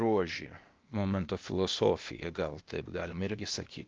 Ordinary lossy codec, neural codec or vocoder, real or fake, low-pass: Opus, 24 kbps; codec, 16 kHz, 0.8 kbps, ZipCodec; fake; 7.2 kHz